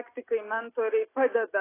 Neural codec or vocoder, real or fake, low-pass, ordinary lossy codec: none; real; 3.6 kHz; AAC, 16 kbps